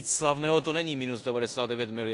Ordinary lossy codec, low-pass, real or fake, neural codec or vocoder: AAC, 48 kbps; 10.8 kHz; fake; codec, 16 kHz in and 24 kHz out, 0.9 kbps, LongCat-Audio-Codec, four codebook decoder